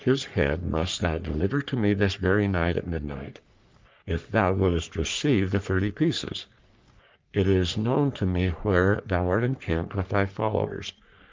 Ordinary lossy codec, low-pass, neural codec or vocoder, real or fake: Opus, 24 kbps; 7.2 kHz; codec, 44.1 kHz, 3.4 kbps, Pupu-Codec; fake